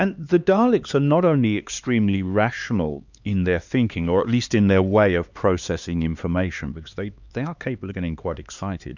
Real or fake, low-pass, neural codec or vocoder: fake; 7.2 kHz; codec, 16 kHz, 2 kbps, X-Codec, HuBERT features, trained on LibriSpeech